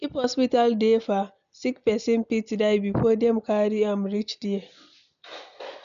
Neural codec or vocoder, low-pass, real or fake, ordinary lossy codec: none; 7.2 kHz; real; none